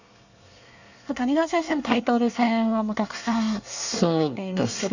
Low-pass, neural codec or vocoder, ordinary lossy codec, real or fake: 7.2 kHz; codec, 24 kHz, 1 kbps, SNAC; none; fake